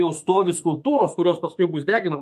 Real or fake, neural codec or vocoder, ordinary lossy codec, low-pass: fake; autoencoder, 48 kHz, 32 numbers a frame, DAC-VAE, trained on Japanese speech; MP3, 64 kbps; 14.4 kHz